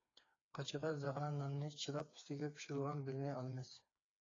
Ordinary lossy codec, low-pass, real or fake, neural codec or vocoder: AAC, 32 kbps; 7.2 kHz; fake; codec, 16 kHz, 4 kbps, FreqCodec, smaller model